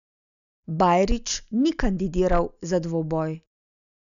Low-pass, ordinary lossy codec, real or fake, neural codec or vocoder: 7.2 kHz; none; real; none